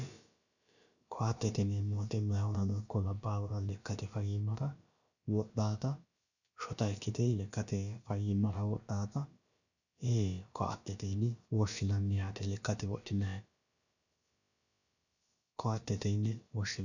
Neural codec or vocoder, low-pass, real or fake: codec, 16 kHz, about 1 kbps, DyCAST, with the encoder's durations; 7.2 kHz; fake